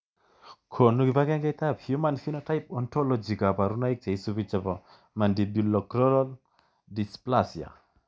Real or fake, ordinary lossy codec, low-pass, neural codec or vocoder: real; none; none; none